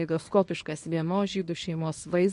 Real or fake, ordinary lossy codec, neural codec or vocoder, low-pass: fake; MP3, 48 kbps; codec, 24 kHz, 0.9 kbps, WavTokenizer, small release; 10.8 kHz